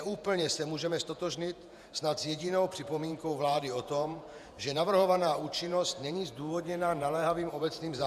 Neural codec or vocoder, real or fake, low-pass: vocoder, 48 kHz, 128 mel bands, Vocos; fake; 14.4 kHz